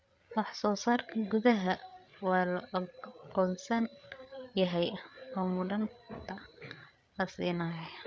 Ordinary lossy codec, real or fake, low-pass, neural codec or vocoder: none; fake; none; codec, 16 kHz, 8 kbps, FreqCodec, larger model